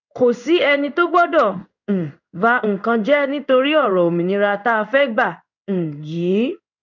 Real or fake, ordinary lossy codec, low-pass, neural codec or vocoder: fake; none; 7.2 kHz; codec, 16 kHz in and 24 kHz out, 1 kbps, XY-Tokenizer